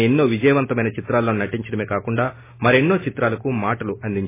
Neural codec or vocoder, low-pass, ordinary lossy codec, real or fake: none; 3.6 kHz; MP3, 16 kbps; real